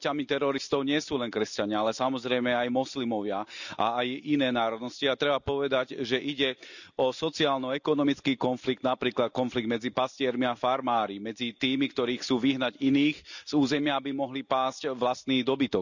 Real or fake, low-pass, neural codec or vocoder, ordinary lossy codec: real; 7.2 kHz; none; none